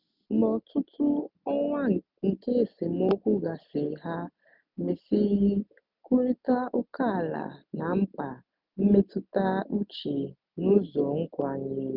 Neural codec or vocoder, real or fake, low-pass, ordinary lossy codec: none; real; 5.4 kHz; none